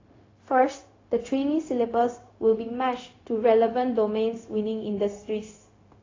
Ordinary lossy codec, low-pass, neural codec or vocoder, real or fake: AAC, 32 kbps; 7.2 kHz; codec, 16 kHz, 0.4 kbps, LongCat-Audio-Codec; fake